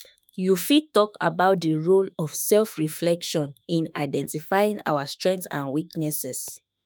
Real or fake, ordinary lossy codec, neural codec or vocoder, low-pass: fake; none; autoencoder, 48 kHz, 32 numbers a frame, DAC-VAE, trained on Japanese speech; none